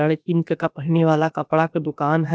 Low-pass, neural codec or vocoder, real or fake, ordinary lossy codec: none; codec, 16 kHz, about 1 kbps, DyCAST, with the encoder's durations; fake; none